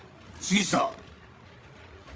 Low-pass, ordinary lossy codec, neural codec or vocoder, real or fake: none; none; codec, 16 kHz, 16 kbps, FreqCodec, larger model; fake